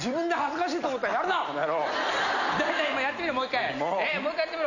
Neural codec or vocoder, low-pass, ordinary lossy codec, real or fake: none; 7.2 kHz; none; real